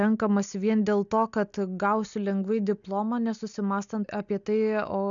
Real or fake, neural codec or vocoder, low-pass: real; none; 7.2 kHz